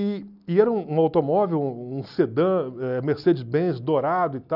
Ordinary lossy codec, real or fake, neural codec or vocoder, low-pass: none; real; none; 5.4 kHz